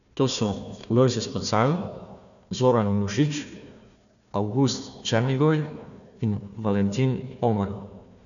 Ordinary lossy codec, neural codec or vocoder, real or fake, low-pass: none; codec, 16 kHz, 1 kbps, FunCodec, trained on Chinese and English, 50 frames a second; fake; 7.2 kHz